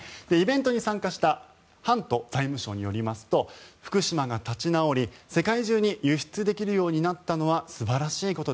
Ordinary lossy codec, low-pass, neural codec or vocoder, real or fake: none; none; none; real